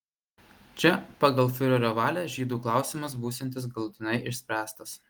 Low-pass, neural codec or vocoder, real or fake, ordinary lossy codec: 19.8 kHz; none; real; Opus, 24 kbps